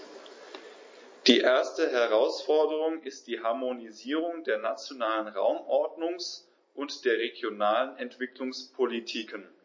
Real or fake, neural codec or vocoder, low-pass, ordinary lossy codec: real; none; 7.2 kHz; MP3, 32 kbps